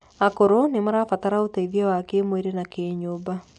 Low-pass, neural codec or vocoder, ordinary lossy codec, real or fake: 10.8 kHz; none; none; real